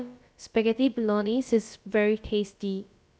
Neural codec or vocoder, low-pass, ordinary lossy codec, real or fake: codec, 16 kHz, about 1 kbps, DyCAST, with the encoder's durations; none; none; fake